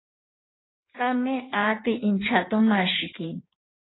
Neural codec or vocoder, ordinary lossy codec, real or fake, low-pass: codec, 16 kHz in and 24 kHz out, 1.1 kbps, FireRedTTS-2 codec; AAC, 16 kbps; fake; 7.2 kHz